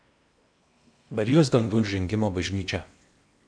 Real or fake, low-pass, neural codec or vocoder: fake; 9.9 kHz; codec, 16 kHz in and 24 kHz out, 0.8 kbps, FocalCodec, streaming, 65536 codes